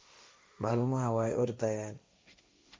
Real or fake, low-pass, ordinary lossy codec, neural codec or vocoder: fake; none; none; codec, 16 kHz, 1.1 kbps, Voila-Tokenizer